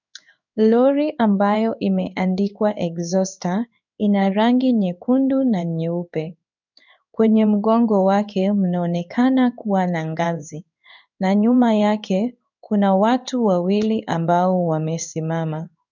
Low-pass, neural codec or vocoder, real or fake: 7.2 kHz; codec, 16 kHz in and 24 kHz out, 1 kbps, XY-Tokenizer; fake